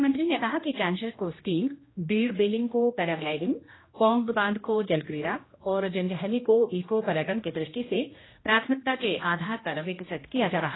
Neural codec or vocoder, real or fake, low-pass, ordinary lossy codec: codec, 16 kHz, 1 kbps, X-Codec, HuBERT features, trained on general audio; fake; 7.2 kHz; AAC, 16 kbps